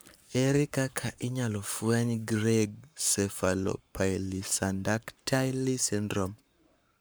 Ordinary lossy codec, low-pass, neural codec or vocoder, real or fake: none; none; codec, 44.1 kHz, 7.8 kbps, Pupu-Codec; fake